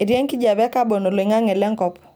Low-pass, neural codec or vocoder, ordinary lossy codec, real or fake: none; none; none; real